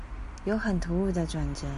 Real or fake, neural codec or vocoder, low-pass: real; none; 10.8 kHz